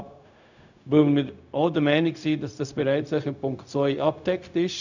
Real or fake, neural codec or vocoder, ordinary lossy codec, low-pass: fake; codec, 16 kHz, 0.4 kbps, LongCat-Audio-Codec; none; 7.2 kHz